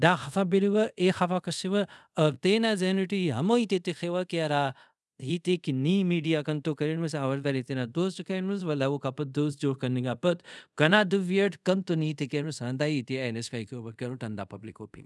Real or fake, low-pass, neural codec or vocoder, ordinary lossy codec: fake; none; codec, 24 kHz, 0.5 kbps, DualCodec; none